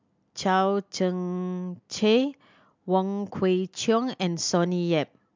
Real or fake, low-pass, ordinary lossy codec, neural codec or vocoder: real; 7.2 kHz; MP3, 64 kbps; none